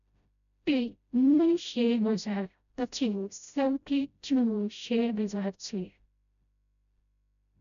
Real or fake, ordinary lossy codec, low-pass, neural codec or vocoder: fake; none; 7.2 kHz; codec, 16 kHz, 0.5 kbps, FreqCodec, smaller model